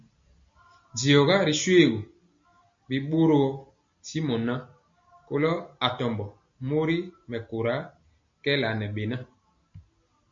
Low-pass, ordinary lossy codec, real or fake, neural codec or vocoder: 7.2 kHz; MP3, 48 kbps; real; none